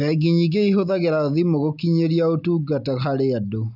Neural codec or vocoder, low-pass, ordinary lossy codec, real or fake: none; 5.4 kHz; none; real